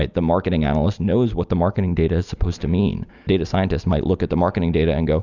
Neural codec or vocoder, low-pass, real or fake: none; 7.2 kHz; real